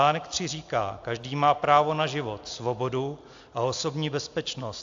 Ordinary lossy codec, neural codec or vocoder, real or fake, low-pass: AAC, 64 kbps; none; real; 7.2 kHz